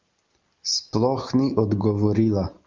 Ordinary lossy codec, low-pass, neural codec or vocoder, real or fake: Opus, 24 kbps; 7.2 kHz; none; real